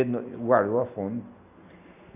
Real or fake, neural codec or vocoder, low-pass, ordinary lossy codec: real; none; 3.6 kHz; none